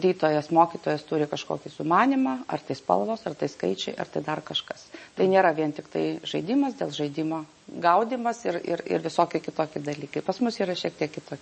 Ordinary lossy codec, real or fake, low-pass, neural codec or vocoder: MP3, 32 kbps; real; 9.9 kHz; none